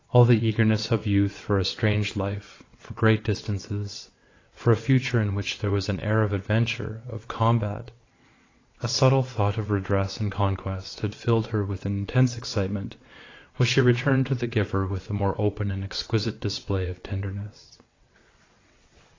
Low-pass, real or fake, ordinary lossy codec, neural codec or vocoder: 7.2 kHz; fake; AAC, 32 kbps; vocoder, 22.05 kHz, 80 mel bands, WaveNeXt